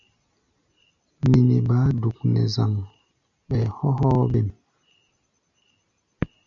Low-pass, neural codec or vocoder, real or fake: 7.2 kHz; none; real